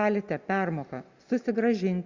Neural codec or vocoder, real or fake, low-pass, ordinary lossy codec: none; real; 7.2 kHz; Opus, 64 kbps